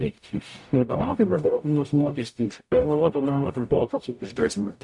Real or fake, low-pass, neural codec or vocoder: fake; 10.8 kHz; codec, 44.1 kHz, 0.9 kbps, DAC